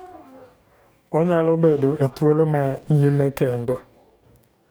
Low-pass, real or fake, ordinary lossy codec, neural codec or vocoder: none; fake; none; codec, 44.1 kHz, 2.6 kbps, DAC